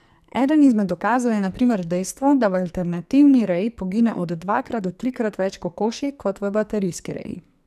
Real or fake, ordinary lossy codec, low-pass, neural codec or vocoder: fake; none; 14.4 kHz; codec, 44.1 kHz, 2.6 kbps, SNAC